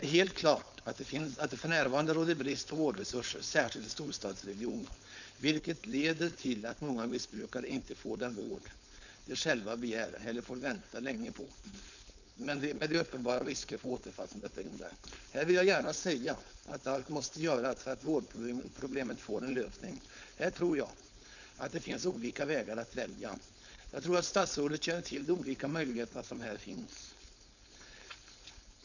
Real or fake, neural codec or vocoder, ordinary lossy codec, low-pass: fake; codec, 16 kHz, 4.8 kbps, FACodec; none; 7.2 kHz